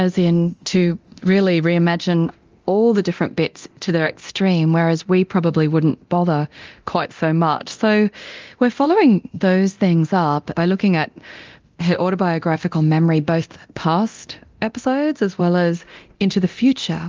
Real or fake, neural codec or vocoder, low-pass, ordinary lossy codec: fake; codec, 24 kHz, 0.9 kbps, DualCodec; 7.2 kHz; Opus, 32 kbps